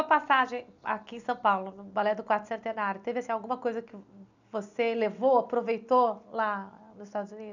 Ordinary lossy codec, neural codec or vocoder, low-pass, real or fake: none; none; 7.2 kHz; real